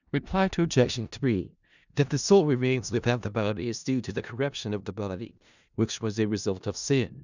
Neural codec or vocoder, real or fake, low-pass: codec, 16 kHz in and 24 kHz out, 0.4 kbps, LongCat-Audio-Codec, four codebook decoder; fake; 7.2 kHz